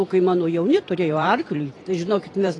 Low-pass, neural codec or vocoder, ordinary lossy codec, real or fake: 10.8 kHz; vocoder, 44.1 kHz, 128 mel bands every 512 samples, BigVGAN v2; AAC, 32 kbps; fake